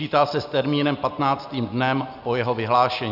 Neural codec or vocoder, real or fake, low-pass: none; real; 5.4 kHz